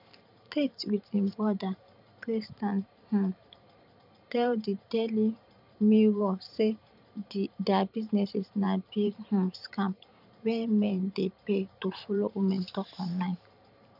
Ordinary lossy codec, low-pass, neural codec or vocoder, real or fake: none; 5.4 kHz; vocoder, 44.1 kHz, 128 mel bands every 512 samples, BigVGAN v2; fake